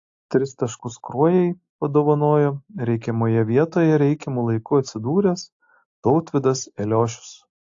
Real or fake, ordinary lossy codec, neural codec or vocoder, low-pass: real; AAC, 48 kbps; none; 7.2 kHz